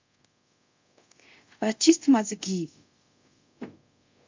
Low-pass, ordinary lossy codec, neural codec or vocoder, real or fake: 7.2 kHz; MP3, 64 kbps; codec, 24 kHz, 0.5 kbps, DualCodec; fake